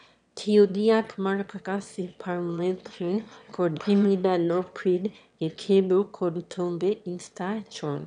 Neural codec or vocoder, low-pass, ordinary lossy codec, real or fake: autoencoder, 22.05 kHz, a latent of 192 numbers a frame, VITS, trained on one speaker; 9.9 kHz; none; fake